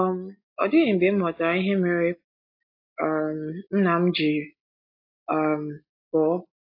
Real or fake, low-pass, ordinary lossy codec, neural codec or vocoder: real; 5.4 kHz; AAC, 32 kbps; none